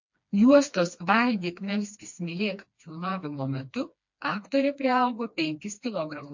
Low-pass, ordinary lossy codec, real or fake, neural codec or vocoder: 7.2 kHz; MP3, 48 kbps; fake; codec, 16 kHz, 2 kbps, FreqCodec, smaller model